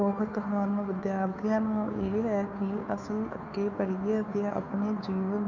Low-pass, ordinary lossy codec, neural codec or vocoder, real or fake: 7.2 kHz; none; codec, 16 kHz, 2 kbps, FunCodec, trained on Chinese and English, 25 frames a second; fake